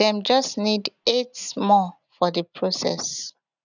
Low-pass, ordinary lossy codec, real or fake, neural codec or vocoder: 7.2 kHz; none; real; none